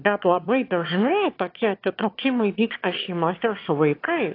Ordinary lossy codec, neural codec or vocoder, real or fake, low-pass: AAC, 32 kbps; autoencoder, 22.05 kHz, a latent of 192 numbers a frame, VITS, trained on one speaker; fake; 5.4 kHz